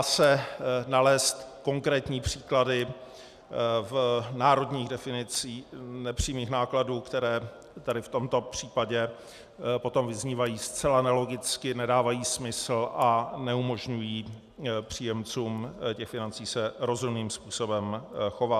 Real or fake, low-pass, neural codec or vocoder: real; 14.4 kHz; none